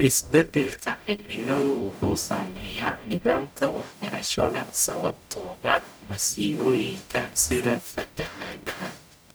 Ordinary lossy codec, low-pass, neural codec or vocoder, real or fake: none; none; codec, 44.1 kHz, 0.9 kbps, DAC; fake